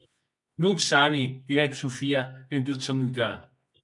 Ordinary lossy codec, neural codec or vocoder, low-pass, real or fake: MP3, 64 kbps; codec, 24 kHz, 0.9 kbps, WavTokenizer, medium music audio release; 10.8 kHz; fake